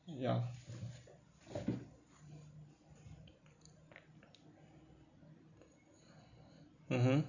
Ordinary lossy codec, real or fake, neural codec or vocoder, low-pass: none; real; none; 7.2 kHz